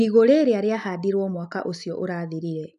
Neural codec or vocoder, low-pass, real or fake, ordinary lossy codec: none; 10.8 kHz; real; none